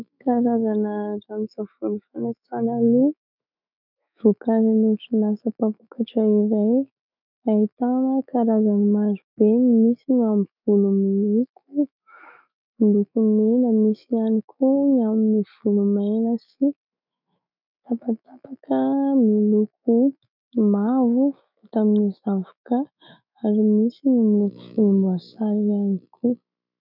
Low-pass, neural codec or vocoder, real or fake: 5.4 kHz; autoencoder, 48 kHz, 128 numbers a frame, DAC-VAE, trained on Japanese speech; fake